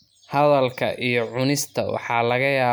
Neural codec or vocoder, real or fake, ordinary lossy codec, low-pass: none; real; none; none